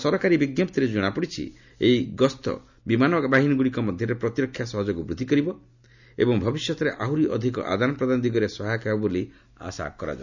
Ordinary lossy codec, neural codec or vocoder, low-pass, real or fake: none; none; 7.2 kHz; real